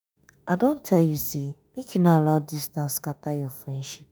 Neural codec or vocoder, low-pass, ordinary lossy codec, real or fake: autoencoder, 48 kHz, 32 numbers a frame, DAC-VAE, trained on Japanese speech; none; none; fake